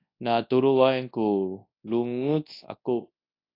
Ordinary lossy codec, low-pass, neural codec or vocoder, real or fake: AAC, 32 kbps; 5.4 kHz; codec, 24 kHz, 0.9 kbps, WavTokenizer, large speech release; fake